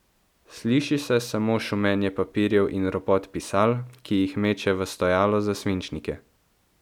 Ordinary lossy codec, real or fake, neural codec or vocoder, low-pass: none; real; none; 19.8 kHz